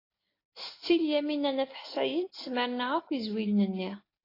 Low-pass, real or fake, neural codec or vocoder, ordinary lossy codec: 5.4 kHz; real; none; AAC, 24 kbps